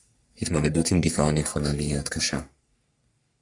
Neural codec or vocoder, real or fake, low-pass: codec, 44.1 kHz, 3.4 kbps, Pupu-Codec; fake; 10.8 kHz